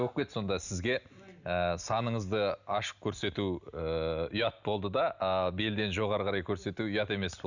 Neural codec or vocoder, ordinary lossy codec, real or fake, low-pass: none; none; real; 7.2 kHz